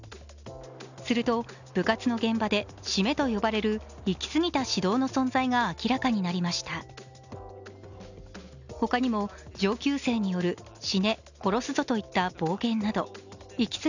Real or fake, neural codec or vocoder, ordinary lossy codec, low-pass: real; none; none; 7.2 kHz